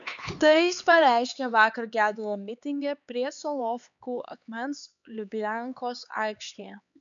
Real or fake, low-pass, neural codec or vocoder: fake; 7.2 kHz; codec, 16 kHz, 4 kbps, X-Codec, HuBERT features, trained on LibriSpeech